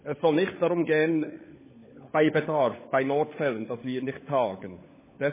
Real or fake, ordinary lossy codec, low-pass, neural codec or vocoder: fake; MP3, 16 kbps; 3.6 kHz; codec, 16 kHz, 16 kbps, FunCodec, trained on LibriTTS, 50 frames a second